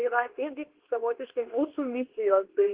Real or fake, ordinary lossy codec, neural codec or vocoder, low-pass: fake; Opus, 16 kbps; codec, 24 kHz, 0.9 kbps, WavTokenizer, medium speech release version 2; 3.6 kHz